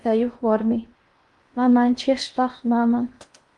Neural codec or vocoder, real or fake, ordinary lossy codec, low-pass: codec, 16 kHz in and 24 kHz out, 0.8 kbps, FocalCodec, streaming, 65536 codes; fake; Opus, 32 kbps; 10.8 kHz